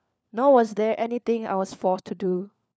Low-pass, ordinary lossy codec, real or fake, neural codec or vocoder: none; none; fake; codec, 16 kHz, 4 kbps, FunCodec, trained on LibriTTS, 50 frames a second